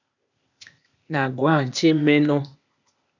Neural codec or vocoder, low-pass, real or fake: codec, 16 kHz, 0.8 kbps, ZipCodec; 7.2 kHz; fake